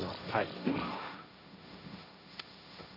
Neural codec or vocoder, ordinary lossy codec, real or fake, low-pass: codec, 16 kHz, 1.1 kbps, Voila-Tokenizer; none; fake; 5.4 kHz